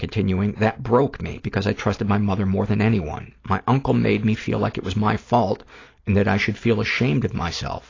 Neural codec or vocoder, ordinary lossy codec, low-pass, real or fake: none; AAC, 32 kbps; 7.2 kHz; real